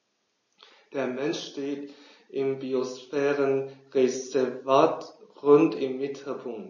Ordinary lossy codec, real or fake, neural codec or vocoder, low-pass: MP3, 32 kbps; real; none; 7.2 kHz